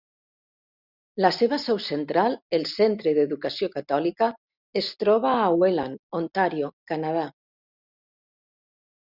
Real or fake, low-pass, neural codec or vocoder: real; 5.4 kHz; none